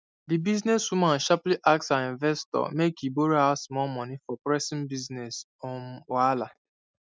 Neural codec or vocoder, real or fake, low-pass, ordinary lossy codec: none; real; none; none